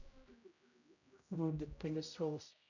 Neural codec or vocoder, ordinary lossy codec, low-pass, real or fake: codec, 16 kHz, 0.5 kbps, X-Codec, HuBERT features, trained on general audio; none; 7.2 kHz; fake